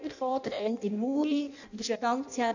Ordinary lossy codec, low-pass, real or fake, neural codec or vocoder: none; 7.2 kHz; fake; codec, 16 kHz in and 24 kHz out, 0.6 kbps, FireRedTTS-2 codec